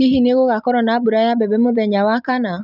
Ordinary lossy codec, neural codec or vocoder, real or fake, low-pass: none; none; real; 5.4 kHz